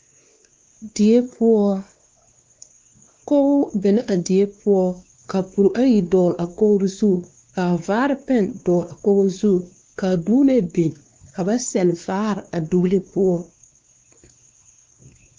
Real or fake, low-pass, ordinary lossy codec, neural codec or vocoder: fake; 7.2 kHz; Opus, 32 kbps; codec, 16 kHz, 2 kbps, X-Codec, WavLM features, trained on Multilingual LibriSpeech